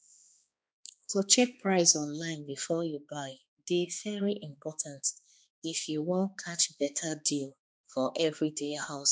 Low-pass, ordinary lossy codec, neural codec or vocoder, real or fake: none; none; codec, 16 kHz, 2 kbps, X-Codec, HuBERT features, trained on balanced general audio; fake